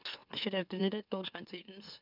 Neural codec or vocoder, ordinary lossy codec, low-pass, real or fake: autoencoder, 44.1 kHz, a latent of 192 numbers a frame, MeloTTS; none; 5.4 kHz; fake